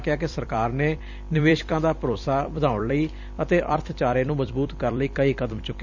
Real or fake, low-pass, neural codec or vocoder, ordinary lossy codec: fake; 7.2 kHz; vocoder, 44.1 kHz, 128 mel bands every 256 samples, BigVGAN v2; none